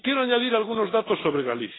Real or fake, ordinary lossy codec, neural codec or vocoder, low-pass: real; AAC, 16 kbps; none; 7.2 kHz